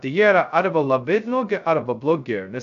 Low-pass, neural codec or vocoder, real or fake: 7.2 kHz; codec, 16 kHz, 0.2 kbps, FocalCodec; fake